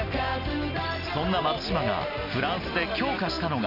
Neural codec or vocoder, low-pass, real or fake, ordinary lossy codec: none; 5.4 kHz; real; none